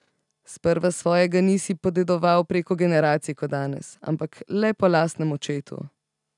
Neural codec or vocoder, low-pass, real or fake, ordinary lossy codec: none; 10.8 kHz; real; none